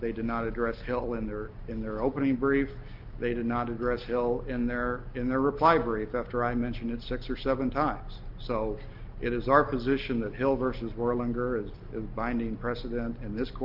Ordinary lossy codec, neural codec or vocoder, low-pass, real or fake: Opus, 32 kbps; none; 5.4 kHz; real